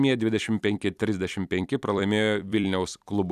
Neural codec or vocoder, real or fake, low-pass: vocoder, 44.1 kHz, 128 mel bands every 256 samples, BigVGAN v2; fake; 14.4 kHz